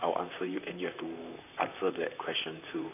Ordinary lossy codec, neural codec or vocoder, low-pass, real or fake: none; vocoder, 44.1 kHz, 128 mel bands, Pupu-Vocoder; 3.6 kHz; fake